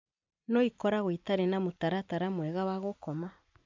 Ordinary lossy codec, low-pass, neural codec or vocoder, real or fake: MP3, 64 kbps; 7.2 kHz; vocoder, 44.1 kHz, 128 mel bands every 256 samples, BigVGAN v2; fake